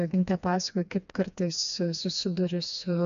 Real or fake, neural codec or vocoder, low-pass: fake; codec, 16 kHz, 2 kbps, FreqCodec, smaller model; 7.2 kHz